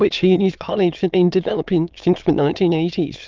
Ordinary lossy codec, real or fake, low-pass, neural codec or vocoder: Opus, 32 kbps; fake; 7.2 kHz; autoencoder, 22.05 kHz, a latent of 192 numbers a frame, VITS, trained on many speakers